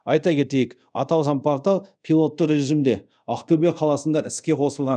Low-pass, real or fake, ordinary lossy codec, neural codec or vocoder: 9.9 kHz; fake; none; codec, 24 kHz, 0.5 kbps, DualCodec